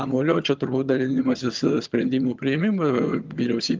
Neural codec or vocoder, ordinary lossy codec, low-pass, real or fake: vocoder, 22.05 kHz, 80 mel bands, HiFi-GAN; Opus, 32 kbps; 7.2 kHz; fake